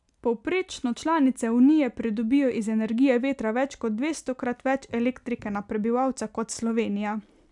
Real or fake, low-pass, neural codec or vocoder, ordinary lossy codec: real; 10.8 kHz; none; none